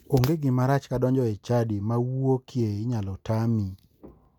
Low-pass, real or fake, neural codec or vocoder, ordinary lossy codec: 19.8 kHz; real; none; none